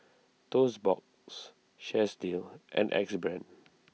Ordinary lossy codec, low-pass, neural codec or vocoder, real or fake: none; none; none; real